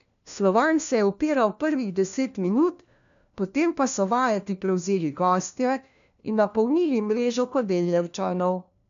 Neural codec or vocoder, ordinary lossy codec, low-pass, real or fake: codec, 16 kHz, 1 kbps, FunCodec, trained on LibriTTS, 50 frames a second; none; 7.2 kHz; fake